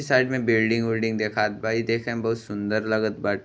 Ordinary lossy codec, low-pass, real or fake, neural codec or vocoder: none; none; real; none